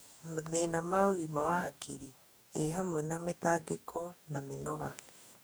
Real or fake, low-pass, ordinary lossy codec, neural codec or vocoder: fake; none; none; codec, 44.1 kHz, 2.6 kbps, DAC